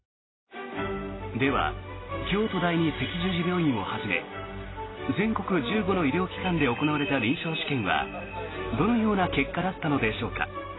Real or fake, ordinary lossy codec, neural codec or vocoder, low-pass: real; AAC, 16 kbps; none; 7.2 kHz